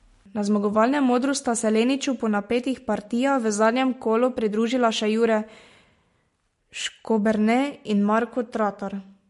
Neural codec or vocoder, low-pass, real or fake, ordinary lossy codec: none; 14.4 kHz; real; MP3, 48 kbps